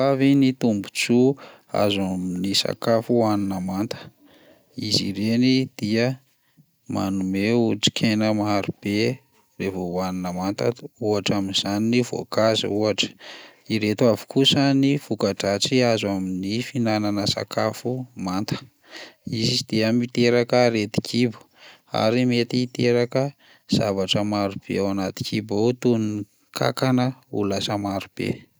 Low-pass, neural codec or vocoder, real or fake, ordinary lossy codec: none; none; real; none